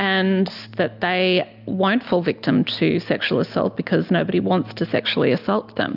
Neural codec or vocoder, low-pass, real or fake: none; 5.4 kHz; real